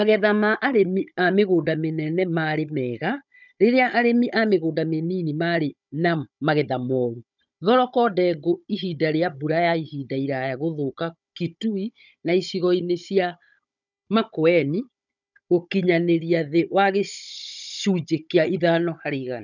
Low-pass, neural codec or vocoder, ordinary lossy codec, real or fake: 7.2 kHz; codec, 16 kHz, 16 kbps, FunCodec, trained on Chinese and English, 50 frames a second; none; fake